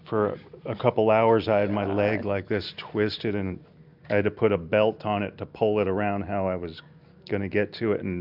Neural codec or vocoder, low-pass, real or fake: none; 5.4 kHz; real